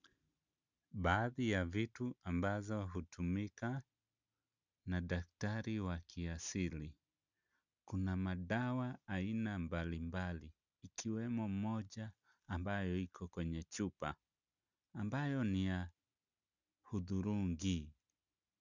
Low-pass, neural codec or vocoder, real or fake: 7.2 kHz; none; real